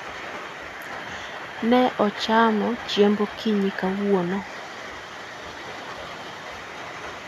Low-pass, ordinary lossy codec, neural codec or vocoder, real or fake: 14.4 kHz; none; none; real